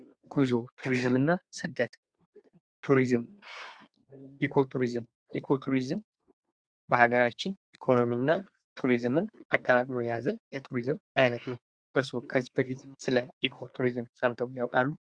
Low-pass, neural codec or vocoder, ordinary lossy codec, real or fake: 9.9 kHz; codec, 24 kHz, 1 kbps, SNAC; Opus, 64 kbps; fake